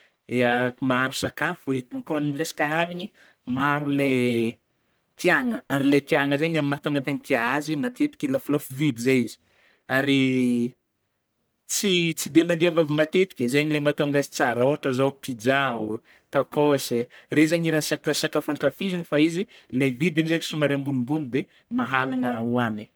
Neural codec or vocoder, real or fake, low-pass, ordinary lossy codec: codec, 44.1 kHz, 1.7 kbps, Pupu-Codec; fake; none; none